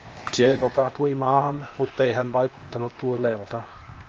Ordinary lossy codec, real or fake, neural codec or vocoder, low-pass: Opus, 24 kbps; fake; codec, 16 kHz, 0.8 kbps, ZipCodec; 7.2 kHz